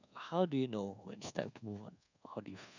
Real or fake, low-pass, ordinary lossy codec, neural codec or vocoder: fake; 7.2 kHz; none; codec, 24 kHz, 1.2 kbps, DualCodec